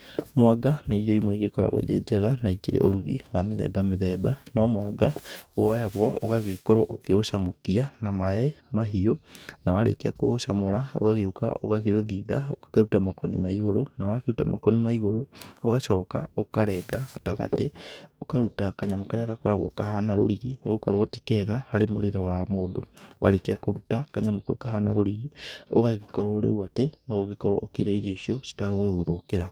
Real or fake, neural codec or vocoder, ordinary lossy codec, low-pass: fake; codec, 44.1 kHz, 2.6 kbps, DAC; none; none